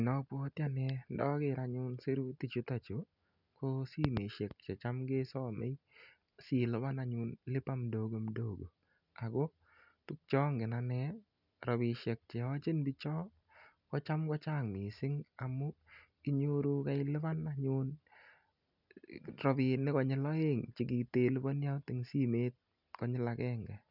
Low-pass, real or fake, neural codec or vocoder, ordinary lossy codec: 5.4 kHz; real; none; none